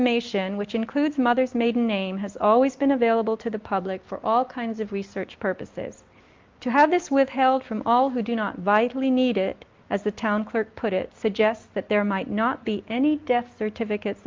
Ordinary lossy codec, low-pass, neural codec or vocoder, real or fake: Opus, 24 kbps; 7.2 kHz; none; real